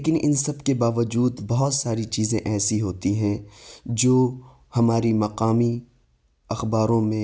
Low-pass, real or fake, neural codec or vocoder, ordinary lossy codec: none; real; none; none